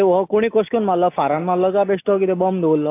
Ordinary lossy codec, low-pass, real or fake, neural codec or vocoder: AAC, 24 kbps; 3.6 kHz; real; none